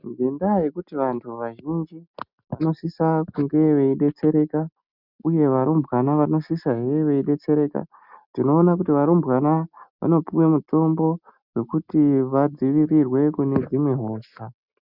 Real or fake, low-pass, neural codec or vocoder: real; 5.4 kHz; none